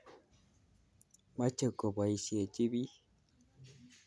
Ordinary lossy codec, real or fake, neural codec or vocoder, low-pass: none; real; none; none